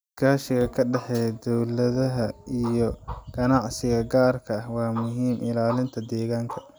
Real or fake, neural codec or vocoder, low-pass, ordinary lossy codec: real; none; none; none